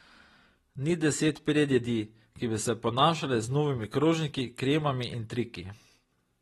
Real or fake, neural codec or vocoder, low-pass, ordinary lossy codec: real; none; 19.8 kHz; AAC, 32 kbps